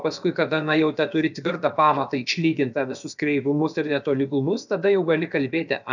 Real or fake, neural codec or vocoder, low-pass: fake; codec, 16 kHz, about 1 kbps, DyCAST, with the encoder's durations; 7.2 kHz